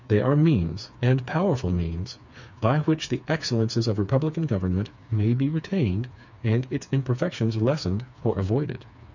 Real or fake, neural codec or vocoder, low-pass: fake; codec, 16 kHz, 4 kbps, FreqCodec, smaller model; 7.2 kHz